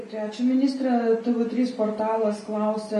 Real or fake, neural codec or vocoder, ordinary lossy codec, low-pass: real; none; MP3, 48 kbps; 14.4 kHz